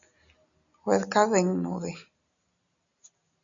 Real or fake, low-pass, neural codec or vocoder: real; 7.2 kHz; none